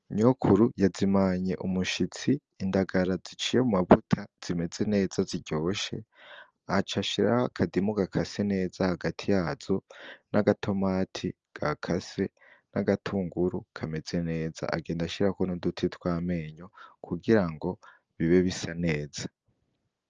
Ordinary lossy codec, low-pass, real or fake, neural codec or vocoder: Opus, 24 kbps; 7.2 kHz; real; none